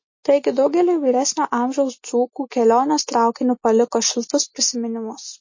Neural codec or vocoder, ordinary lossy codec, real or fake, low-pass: none; MP3, 32 kbps; real; 7.2 kHz